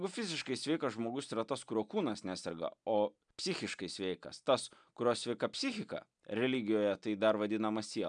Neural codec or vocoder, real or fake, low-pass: none; real; 9.9 kHz